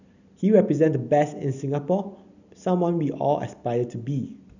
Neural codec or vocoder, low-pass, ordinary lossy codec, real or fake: none; 7.2 kHz; none; real